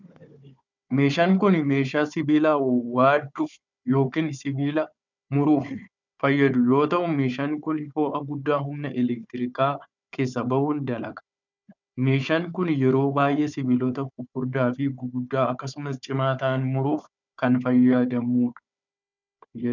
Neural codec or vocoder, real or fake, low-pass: codec, 16 kHz, 4 kbps, FunCodec, trained on Chinese and English, 50 frames a second; fake; 7.2 kHz